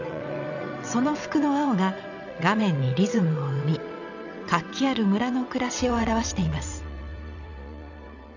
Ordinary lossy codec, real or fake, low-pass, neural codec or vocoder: none; fake; 7.2 kHz; vocoder, 22.05 kHz, 80 mel bands, WaveNeXt